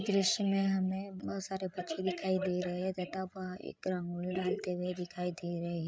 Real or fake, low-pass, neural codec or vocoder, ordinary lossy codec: fake; none; codec, 16 kHz, 8 kbps, FreqCodec, larger model; none